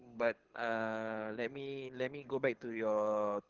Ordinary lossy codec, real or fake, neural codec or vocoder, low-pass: Opus, 16 kbps; fake; codec, 24 kHz, 6 kbps, HILCodec; 7.2 kHz